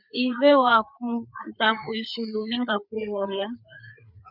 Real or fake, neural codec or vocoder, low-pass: fake; codec, 16 kHz, 4 kbps, FreqCodec, larger model; 5.4 kHz